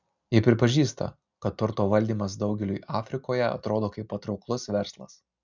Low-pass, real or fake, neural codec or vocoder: 7.2 kHz; real; none